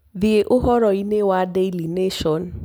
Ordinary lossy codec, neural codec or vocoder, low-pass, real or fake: none; none; none; real